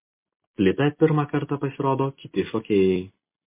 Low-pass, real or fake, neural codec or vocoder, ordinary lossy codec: 3.6 kHz; real; none; MP3, 24 kbps